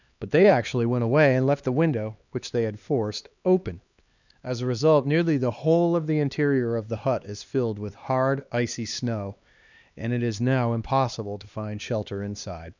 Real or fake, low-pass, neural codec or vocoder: fake; 7.2 kHz; codec, 16 kHz, 2 kbps, X-Codec, HuBERT features, trained on LibriSpeech